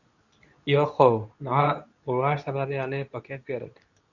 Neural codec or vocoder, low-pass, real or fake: codec, 24 kHz, 0.9 kbps, WavTokenizer, medium speech release version 2; 7.2 kHz; fake